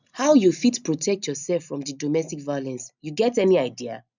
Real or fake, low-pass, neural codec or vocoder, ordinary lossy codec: real; 7.2 kHz; none; none